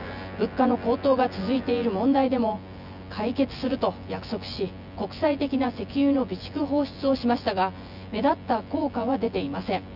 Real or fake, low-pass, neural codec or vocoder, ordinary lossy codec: fake; 5.4 kHz; vocoder, 24 kHz, 100 mel bands, Vocos; none